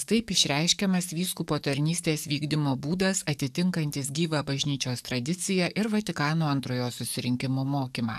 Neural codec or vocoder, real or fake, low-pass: codec, 44.1 kHz, 7.8 kbps, DAC; fake; 14.4 kHz